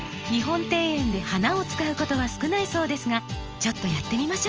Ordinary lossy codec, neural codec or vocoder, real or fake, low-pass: Opus, 24 kbps; none; real; 7.2 kHz